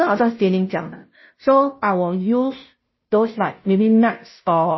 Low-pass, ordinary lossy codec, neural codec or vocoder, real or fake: 7.2 kHz; MP3, 24 kbps; codec, 16 kHz, 0.5 kbps, FunCodec, trained on Chinese and English, 25 frames a second; fake